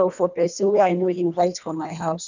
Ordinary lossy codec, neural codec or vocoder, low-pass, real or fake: none; codec, 24 kHz, 1.5 kbps, HILCodec; 7.2 kHz; fake